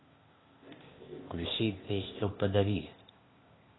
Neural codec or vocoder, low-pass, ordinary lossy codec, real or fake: codec, 16 kHz, 0.8 kbps, ZipCodec; 7.2 kHz; AAC, 16 kbps; fake